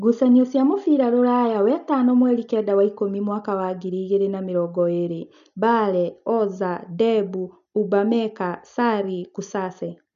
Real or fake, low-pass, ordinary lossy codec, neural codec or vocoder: real; 7.2 kHz; none; none